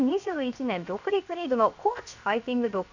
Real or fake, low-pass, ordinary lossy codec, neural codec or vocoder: fake; 7.2 kHz; none; codec, 16 kHz, 0.7 kbps, FocalCodec